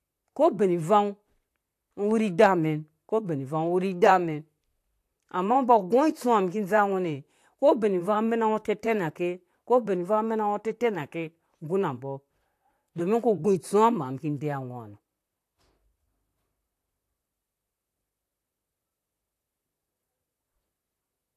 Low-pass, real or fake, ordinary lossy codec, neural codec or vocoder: 14.4 kHz; fake; AAC, 64 kbps; vocoder, 44.1 kHz, 128 mel bands, Pupu-Vocoder